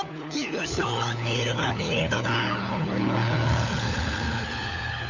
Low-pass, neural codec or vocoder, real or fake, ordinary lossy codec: 7.2 kHz; codec, 16 kHz, 4 kbps, FunCodec, trained on LibriTTS, 50 frames a second; fake; none